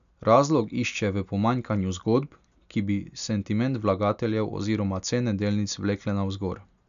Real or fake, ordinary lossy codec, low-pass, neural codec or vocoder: real; none; 7.2 kHz; none